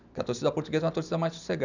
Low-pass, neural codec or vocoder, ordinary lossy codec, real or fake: 7.2 kHz; none; none; real